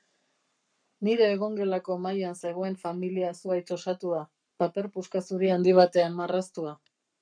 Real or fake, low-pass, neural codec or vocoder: fake; 9.9 kHz; codec, 44.1 kHz, 7.8 kbps, Pupu-Codec